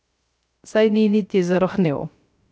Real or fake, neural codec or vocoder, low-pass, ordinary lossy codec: fake; codec, 16 kHz, 0.3 kbps, FocalCodec; none; none